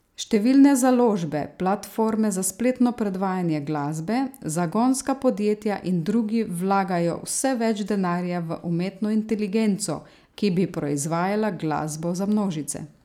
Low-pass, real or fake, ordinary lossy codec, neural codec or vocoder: 19.8 kHz; real; none; none